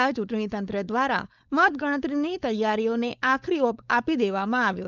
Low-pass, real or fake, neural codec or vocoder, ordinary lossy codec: 7.2 kHz; fake; codec, 16 kHz, 4.8 kbps, FACodec; none